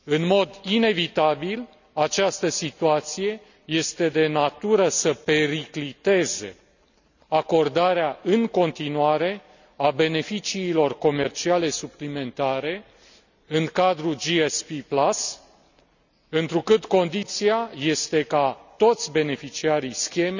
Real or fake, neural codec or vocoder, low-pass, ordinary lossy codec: real; none; 7.2 kHz; none